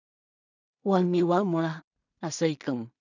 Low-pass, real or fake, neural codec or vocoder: 7.2 kHz; fake; codec, 16 kHz in and 24 kHz out, 0.4 kbps, LongCat-Audio-Codec, two codebook decoder